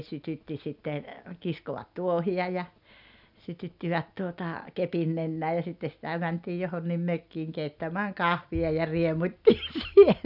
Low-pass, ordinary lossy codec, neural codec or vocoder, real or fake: 5.4 kHz; none; none; real